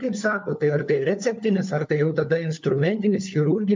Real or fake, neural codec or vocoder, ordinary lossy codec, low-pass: fake; codec, 16 kHz, 4 kbps, FunCodec, trained on LibriTTS, 50 frames a second; MP3, 64 kbps; 7.2 kHz